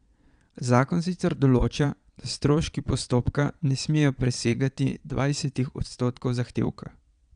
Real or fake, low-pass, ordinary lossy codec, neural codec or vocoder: fake; 9.9 kHz; none; vocoder, 22.05 kHz, 80 mel bands, Vocos